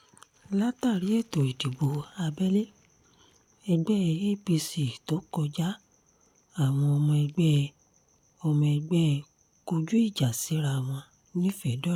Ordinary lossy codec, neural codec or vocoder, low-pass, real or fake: none; none; 19.8 kHz; real